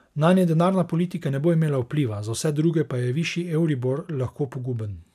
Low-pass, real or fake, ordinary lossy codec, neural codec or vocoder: 14.4 kHz; real; none; none